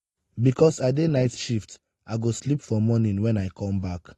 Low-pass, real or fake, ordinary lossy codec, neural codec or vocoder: 19.8 kHz; real; AAC, 32 kbps; none